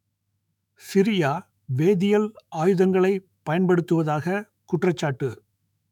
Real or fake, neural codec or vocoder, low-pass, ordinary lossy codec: fake; autoencoder, 48 kHz, 128 numbers a frame, DAC-VAE, trained on Japanese speech; 19.8 kHz; none